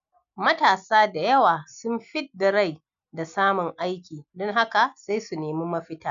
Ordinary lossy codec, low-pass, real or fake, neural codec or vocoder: none; 7.2 kHz; real; none